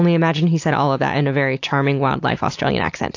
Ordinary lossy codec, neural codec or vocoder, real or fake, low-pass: AAC, 48 kbps; none; real; 7.2 kHz